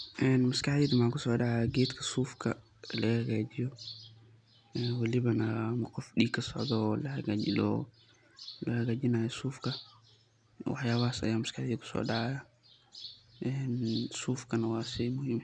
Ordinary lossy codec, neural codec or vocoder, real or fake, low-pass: none; none; real; 9.9 kHz